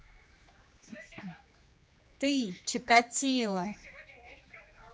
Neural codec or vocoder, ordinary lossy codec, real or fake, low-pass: codec, 16 kHz, 2 kbps, X-Codec, HuBERT features, trained on general audio; none; fake; none